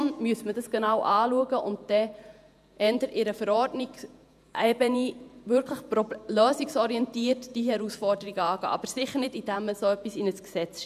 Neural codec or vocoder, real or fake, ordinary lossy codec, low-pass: vocoder, 48 kHz, 128 mel bands, Vocos; fake; none; 14.4 kHz